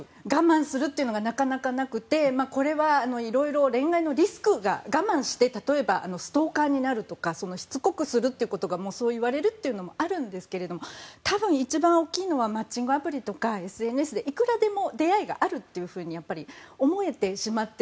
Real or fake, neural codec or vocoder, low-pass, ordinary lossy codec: real; none; none; none